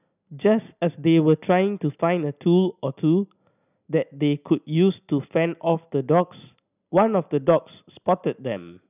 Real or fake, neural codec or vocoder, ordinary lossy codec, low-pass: real; none; none; 3.6 kHz